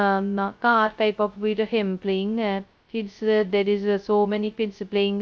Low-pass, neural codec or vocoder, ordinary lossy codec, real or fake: none; codec, 16 kHz, 0.2 kbps, FocalCodec; none; fake